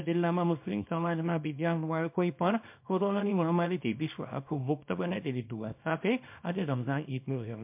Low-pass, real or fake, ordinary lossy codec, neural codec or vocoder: 3.6 kHz; fake; MP3, 32 kbps; codec, 24 kHz, 0.9 kbps, WavTokenizer, small release